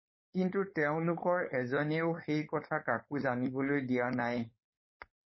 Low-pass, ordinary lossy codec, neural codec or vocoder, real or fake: 7.2 kHz; MP3, 24 kbps; codec, 16 kHz, 4.8 kbps, FACodec; fake